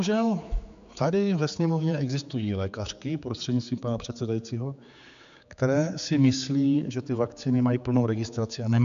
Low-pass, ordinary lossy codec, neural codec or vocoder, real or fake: 7.2 kHz; MP3, 64 kbps; codec, 16 kHz, 4 kbps, X-Codec, HuBERT features, trained on general audio; fake